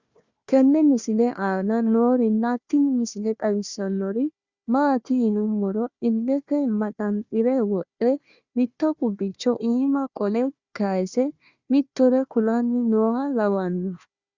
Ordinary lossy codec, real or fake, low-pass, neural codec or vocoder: Opus, 64 kbps; fake; 7.2 kHz; codec, 16 kHz, 1 kbps, FunCodec, trained on Chinese and English, 50 frames a second